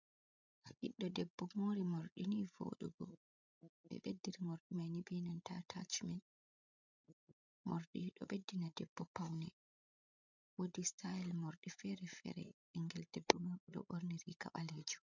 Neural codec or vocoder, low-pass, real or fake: codec, 16 kHz, 16 kbps, FreqCodec, larger model; 7.2 kHz; fake